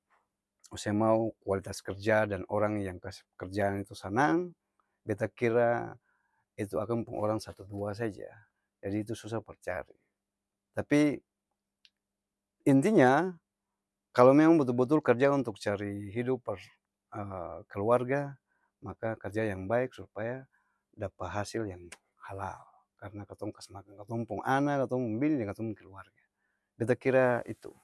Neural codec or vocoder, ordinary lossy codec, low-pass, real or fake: none; none; none; real